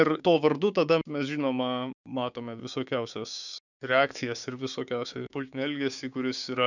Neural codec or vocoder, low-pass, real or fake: codec, 16 kHz, 6 kbps, DAC; 7.2 kHz; fake